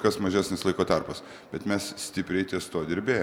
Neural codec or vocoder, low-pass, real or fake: none; 19.8 kHz; real